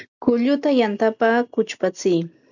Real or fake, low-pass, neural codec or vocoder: real; 7.2 kHz; none